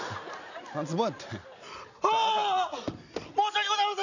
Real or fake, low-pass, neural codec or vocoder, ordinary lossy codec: real; 7.2 kHz; none; none